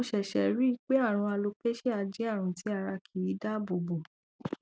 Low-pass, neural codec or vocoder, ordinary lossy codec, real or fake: none; none; none; real